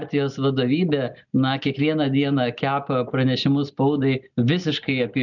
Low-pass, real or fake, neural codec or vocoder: 7.2 kHz; real; none